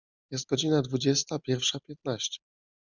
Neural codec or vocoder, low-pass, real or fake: none; 7.2 kHz; real